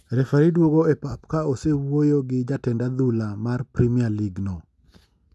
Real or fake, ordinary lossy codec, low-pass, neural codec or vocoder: real; none; none; none